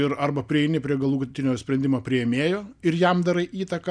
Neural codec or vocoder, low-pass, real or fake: none; 9.9 kHz; real